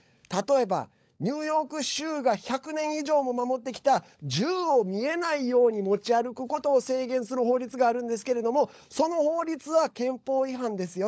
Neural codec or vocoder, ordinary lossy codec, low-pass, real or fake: codec, 16 kHz, 16 kbps, FunCodec, trained on LibriTTS, 50 frames a second; none; none; fake